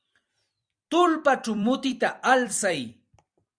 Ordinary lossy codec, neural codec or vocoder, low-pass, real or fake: Opus, 64 kbps; vocoder, 44.1 kHz, 128 mel bands every 256 samples, BigVGAN v2; 9.9 kHz; fake